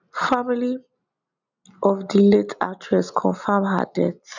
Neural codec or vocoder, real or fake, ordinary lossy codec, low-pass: none; real; none; 7.2 kHz